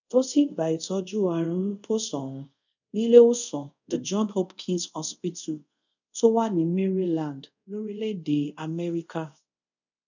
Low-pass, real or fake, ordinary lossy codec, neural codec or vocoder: 7.2 kHz; fake; none; codec, 24 kHz, 0.5 kbps, DualCodec